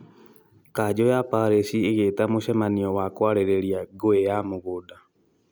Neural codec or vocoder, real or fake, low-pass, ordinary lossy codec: none; real; none; none